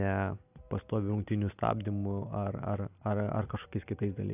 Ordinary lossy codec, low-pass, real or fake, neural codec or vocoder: AAC, 32 kbps; 3.6 kHz; real; none